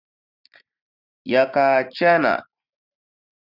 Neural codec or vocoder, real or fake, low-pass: none; real; 5.4 kHz